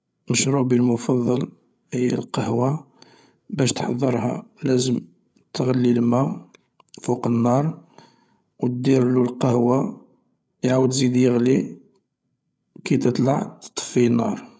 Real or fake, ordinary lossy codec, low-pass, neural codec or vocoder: fake; none; none; codec, 16 kHz, 8 kbps, FreqCodec, larger model